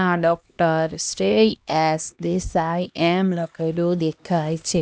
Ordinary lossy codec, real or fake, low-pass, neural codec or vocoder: none; fake; none; codec, 16 kHz, 1 kbps, X-Codec, HuBERT features, trained on LibriSpeech